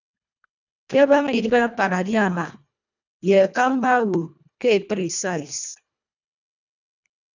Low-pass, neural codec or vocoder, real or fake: 7.2 kHz; codec, 24 kHz, 1.5 kbps, HILCodec; fake